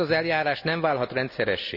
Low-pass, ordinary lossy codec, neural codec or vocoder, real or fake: 5.4 kHz; none; none; real